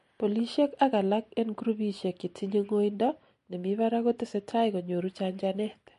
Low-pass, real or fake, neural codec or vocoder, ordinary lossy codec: 14.4 kHz; real; none; MP3, 48 kbps